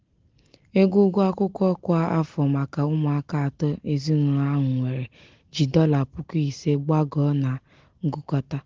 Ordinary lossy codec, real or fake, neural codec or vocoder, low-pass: Opus, 16 kbps; real; none; 7.2 kHz